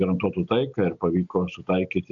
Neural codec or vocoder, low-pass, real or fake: none; 7.2 kHz; real